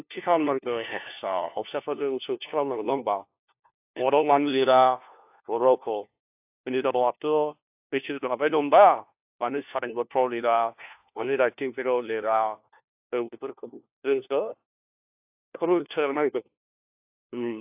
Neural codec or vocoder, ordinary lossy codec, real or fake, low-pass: codec, 16 kHz, 1 kbps, FunCodec, trained on LibriTTS, 50 frames a second; AAC, 32 kbps; fake; 3.6 kHz